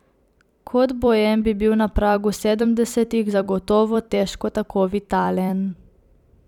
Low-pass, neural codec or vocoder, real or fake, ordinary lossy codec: 19.8 kHz; vocoder, 44.1 kHz, 128 mel bands every 256 samples, BigVGAN v2; fake; none